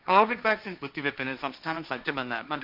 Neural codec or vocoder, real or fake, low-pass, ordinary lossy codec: codec, 16 kHz, 1.1 kbps, Voila-Tokenizer; fake; 5.4 kHz; none